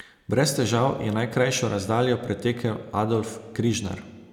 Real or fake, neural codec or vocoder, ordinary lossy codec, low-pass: real; none; none; 19.8 kHz